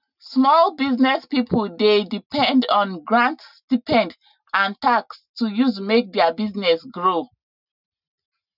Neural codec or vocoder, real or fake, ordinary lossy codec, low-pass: none; real; none; 5.4 kHz